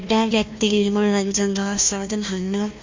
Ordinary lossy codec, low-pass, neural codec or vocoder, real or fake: MP3, 48 kbps; 7.2 kHz; codec, 16 kHz, 1 kbps, FunCodec, trained on Chinese and English, 50 frames a second; fake